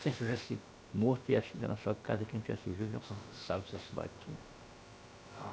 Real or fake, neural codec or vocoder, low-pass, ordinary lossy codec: fake; codec, 16 kHz, about 1 kbps, DyCAST, with the encoder's durations; none; none